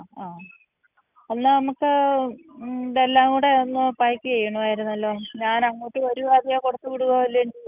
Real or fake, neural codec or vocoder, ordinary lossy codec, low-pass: real; none; none; 3.6 kHz